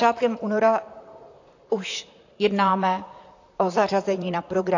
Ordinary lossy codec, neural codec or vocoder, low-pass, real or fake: AAC, 48 kbps; vocoder, 44.1 kHz, 128 mel bands, Pupu-Vocoder; 7.2 kHz; fake